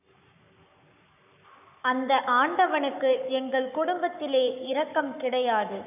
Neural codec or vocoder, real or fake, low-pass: codec, 44.1 kHz, 7.8 kbps, Pupu-Codec; fake; 3.6 kHz